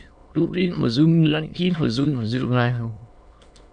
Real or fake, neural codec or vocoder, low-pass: fake; autoencoder, 22.05 kHz, a latent of 192 numbers a frame, VITS, trained on many speakers; 9.9 kHz